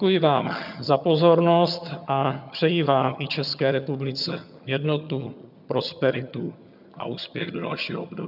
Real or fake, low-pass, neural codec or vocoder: fake; 5.4 kHz; vocoder, 22.05 kHz, 80 mel bands, HiFi-GAN